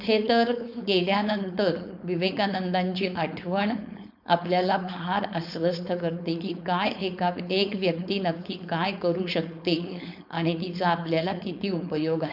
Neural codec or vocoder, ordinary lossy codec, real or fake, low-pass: codec, 16 kHz, 4.8 kbps, FACodec; none; fake; 5.4 kHz